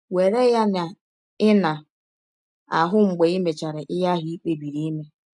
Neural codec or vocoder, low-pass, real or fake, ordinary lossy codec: none; 10.8 kHz; real; none